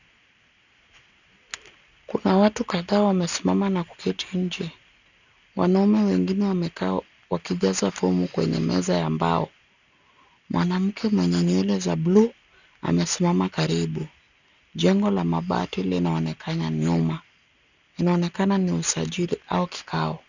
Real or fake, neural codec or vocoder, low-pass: real; none; 7.2 kHz